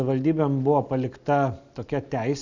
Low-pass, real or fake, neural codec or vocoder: 7.2 kHz; real; none